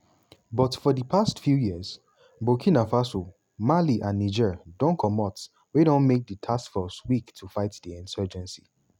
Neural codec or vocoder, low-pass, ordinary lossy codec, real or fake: none; 19.8 kHz; none; real